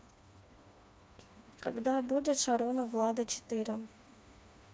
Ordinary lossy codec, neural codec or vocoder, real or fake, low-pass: none; codec, 16 kHz, 2 kbps, FreqCodec, smaller model; fake; none